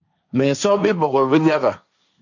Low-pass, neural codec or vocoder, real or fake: 7.2 kHz; codec, 16 kHz, 1.1 kbps, Voila-Tokenizer; fake